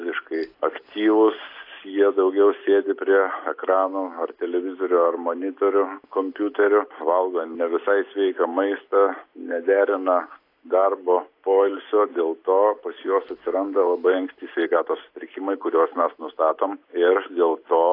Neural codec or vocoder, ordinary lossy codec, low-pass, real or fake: none; AAC, 32 kbps; 5.4 kHz; real